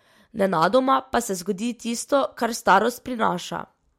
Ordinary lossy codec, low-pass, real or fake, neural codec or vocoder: MP3, 64 kbps; 19.8 kHz; real; none